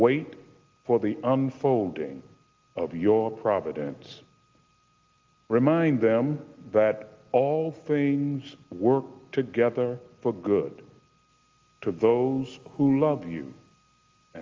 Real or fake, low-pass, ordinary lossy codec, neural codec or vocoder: real; 7.2 kHz; Opus, 32 kbps; none